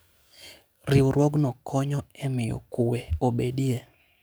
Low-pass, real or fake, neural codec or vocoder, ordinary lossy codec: none; fake; codec, 44.1 kHz, 7.8 kbps, DAC; none